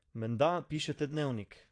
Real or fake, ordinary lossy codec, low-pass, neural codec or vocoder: fake; AAC, 48 kbps; 9.9 kHz; vocoder, 22.05 kHz, 80 mel bands, Vocos